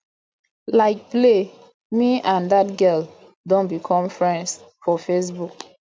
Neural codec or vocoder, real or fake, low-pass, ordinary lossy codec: none; real; none; none